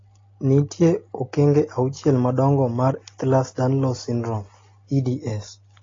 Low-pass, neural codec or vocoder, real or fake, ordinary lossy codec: 7.2 kHz; none; real; AAC, 32 kbps